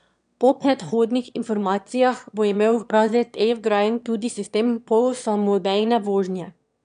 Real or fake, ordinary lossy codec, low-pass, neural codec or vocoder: fake; none; 9.9 kHz; autoencoder, 22.05 kHz, a latent of 192 numbers a frame, VITS, trained on one speaker